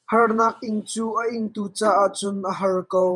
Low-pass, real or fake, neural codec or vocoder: 10.8 kHz; fake; vocoder, 48 kHz, 128 mel bands, Vocos